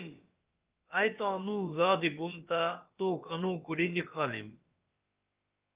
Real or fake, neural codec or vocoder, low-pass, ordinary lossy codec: fake; codec, 16 kHz, about 1 kbps, DyCAST, with the encoder's durations; 3.6 kHz; Opus, 24 kbps